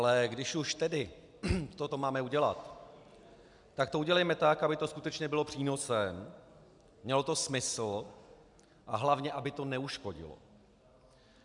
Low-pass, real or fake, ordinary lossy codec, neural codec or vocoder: 10.8 kHz; real; MP3, 96 kbps; none